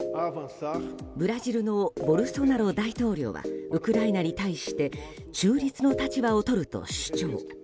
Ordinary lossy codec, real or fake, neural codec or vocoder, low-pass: none; real; none; none